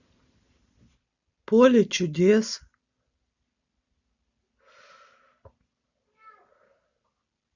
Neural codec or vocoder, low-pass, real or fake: none; 7.2 kHz; real